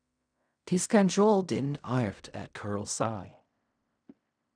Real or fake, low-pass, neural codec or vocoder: fake; 9.9 kHz; codec, 16 kHz in and 24 kHz out, 0.4 kbps, LongCat-Audio-Codec, fine tuned four codebook decoder